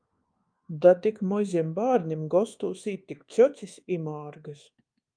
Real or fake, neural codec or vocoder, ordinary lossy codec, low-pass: fake; codec, 24 kHz, 1.2 kbps, DualCodec; Opus, 32 kbps; 9.9 kHz